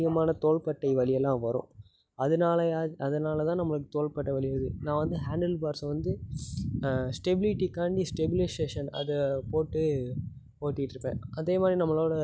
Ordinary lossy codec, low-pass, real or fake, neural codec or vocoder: none; none; real; none